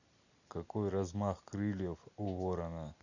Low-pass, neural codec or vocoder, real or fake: 7.2 kHz; none; real